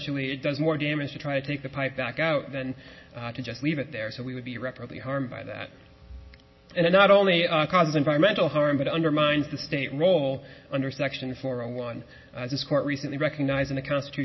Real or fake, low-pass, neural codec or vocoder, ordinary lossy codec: real; 7.2 kHz; none; MP3, 24 kbps